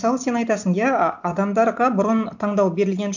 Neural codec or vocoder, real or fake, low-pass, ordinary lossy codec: none; real; 7.2 kHz; none